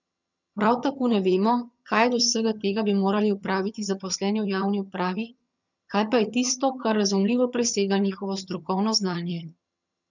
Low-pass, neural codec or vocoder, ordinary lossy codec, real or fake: 7.2 kHz; vocoder, 22.05 kHz, 80 mel bands, HiFi-GAN; none; fake